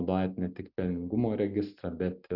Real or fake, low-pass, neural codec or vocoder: real; 5.4 kHz; none